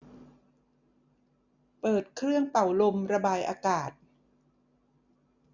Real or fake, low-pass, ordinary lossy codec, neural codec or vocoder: real; 7.2 kHz; none; none